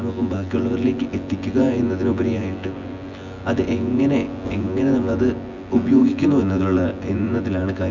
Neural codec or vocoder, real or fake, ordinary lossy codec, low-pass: vocoder, 24 kHz, 100 mel bands, Vocos; fake; none; 7.2 kHz